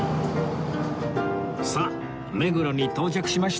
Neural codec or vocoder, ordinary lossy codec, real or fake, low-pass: none; none; real; none